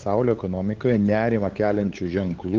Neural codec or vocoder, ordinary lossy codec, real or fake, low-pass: codec, 16 kHz, 8 kbps, FunCodec, trained on LibriTTS, 25 frames a second; Opus, 16 kbps; fake; 7.2 kHz